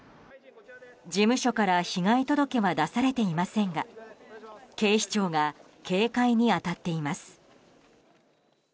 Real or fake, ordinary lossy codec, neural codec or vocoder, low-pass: real; none; none; none